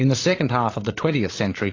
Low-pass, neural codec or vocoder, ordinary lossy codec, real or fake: 7.2 kHz; codec, 16 kHz, 8 kbps, FreqCodec, larger model; AAC, 32 kbps; fake